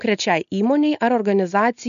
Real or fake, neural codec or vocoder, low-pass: real; none; 7.2 kHz